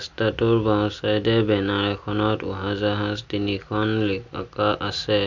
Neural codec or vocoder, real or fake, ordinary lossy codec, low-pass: none; real; none; 7.2 kHz